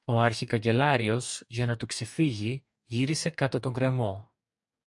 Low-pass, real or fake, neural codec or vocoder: 10.8 kHz; fake; codec, 44.1 kHz, 2.6 kbps, DAC